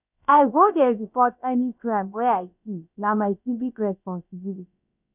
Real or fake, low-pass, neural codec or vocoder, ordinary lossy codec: fake; 3.6 kHz; codec, 16 kHz, about 1 kbps, DyCAST, with the encoder's durations; none